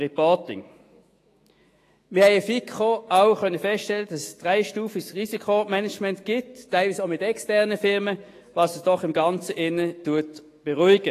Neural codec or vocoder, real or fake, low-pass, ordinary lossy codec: autoencoder, 48 kHz, 128 numbers a frame, DAC-VAE, trained on Japanese speech; fake; 14.4 kHz; AAC, 48 kbps